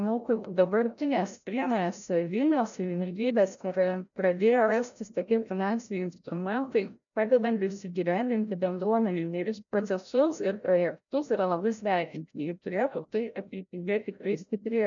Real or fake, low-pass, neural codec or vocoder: fake; 7.2 kHz; codec, 16 kHz, 0.5 kbps, FreqCodec, larger model